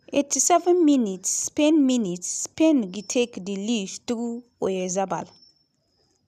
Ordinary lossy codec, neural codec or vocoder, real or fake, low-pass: none; none; real; 14.4 kHz